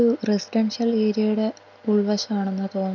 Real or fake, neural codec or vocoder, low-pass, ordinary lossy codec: real; none; 7.2 kHz; none